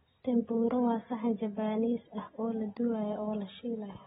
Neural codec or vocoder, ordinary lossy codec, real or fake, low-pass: none; AAC, 16 kbps; real; 10.8 kHz